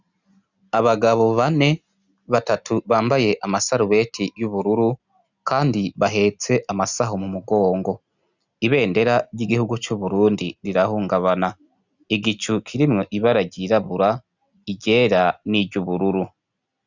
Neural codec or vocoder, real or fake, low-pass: none; real; 7.2 kHz